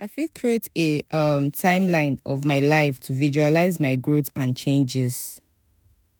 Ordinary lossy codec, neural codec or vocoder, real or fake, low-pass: none; autoencoder, 48 kHz, 32 numbers a frame, DAC-VAE, trained on Japanese speech; fake; none